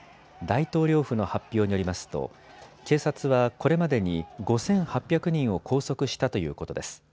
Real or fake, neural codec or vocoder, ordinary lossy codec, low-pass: real; none; none; none